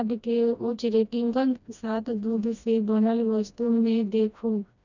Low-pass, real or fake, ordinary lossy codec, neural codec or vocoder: 7.2 kHz; fake; none; codec, 16 kHz, 1 kbps, FreqCodec, smaller model